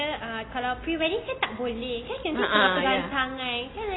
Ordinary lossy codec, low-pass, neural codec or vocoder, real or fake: AAC, 16 kbps; 7.2 kHz; none; real